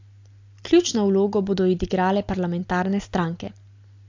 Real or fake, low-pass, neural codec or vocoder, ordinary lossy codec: real; 7.2 kHz; none; AAC, 48 kbps